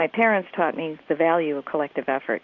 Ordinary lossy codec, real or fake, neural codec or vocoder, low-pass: AAC, 48 kbps; real; none; 7.2 kHz